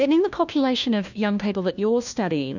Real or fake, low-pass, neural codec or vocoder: fake; 7.2 kHz; codec, 16 kHz, 1 kbps, FunCodec, trained on LibriTTS, 50 frames a second